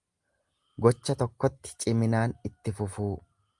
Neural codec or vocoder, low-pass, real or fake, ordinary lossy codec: none; 10.8 kHz; real; Opus, 32 kbps